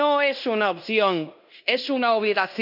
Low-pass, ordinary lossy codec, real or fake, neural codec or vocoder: 5.4 kHz; none; fake; codec, 16 kHz in and 24 kHz out, 0.9 kbps, LongCat-Audio-Codec, fine tuned four codebook decoder